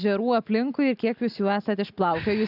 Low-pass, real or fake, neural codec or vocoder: 5.4 kHz; real; none